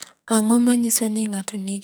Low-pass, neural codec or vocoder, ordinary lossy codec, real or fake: none; codec, 44.1 kHz, 2.6 kbps, SNAC; none; fake